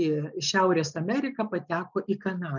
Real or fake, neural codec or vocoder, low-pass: real; none; 7.2 kHz